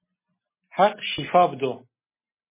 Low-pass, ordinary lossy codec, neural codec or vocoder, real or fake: 3.6 kHz; MP3, 16 kbps; none; real